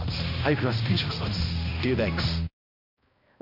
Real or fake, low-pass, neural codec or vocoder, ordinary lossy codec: fake; 5.4 kHz; codec, 16 kHz, 1 kbps, X-Codec, HuBERT features, trained on balanced general audio; none